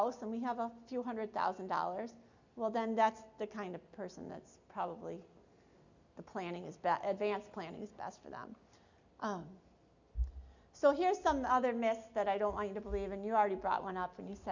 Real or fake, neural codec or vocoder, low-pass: real; none; 7.2 kHz